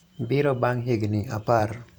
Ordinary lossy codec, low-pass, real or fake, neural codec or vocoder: none; 19.8 kHz; fake; vocoder, 44.1 kHz, 128 mel bands every 512 samples, BigVGAN v2